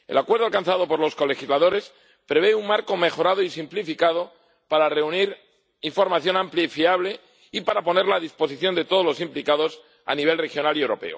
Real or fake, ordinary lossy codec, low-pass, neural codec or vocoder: real; none; none; none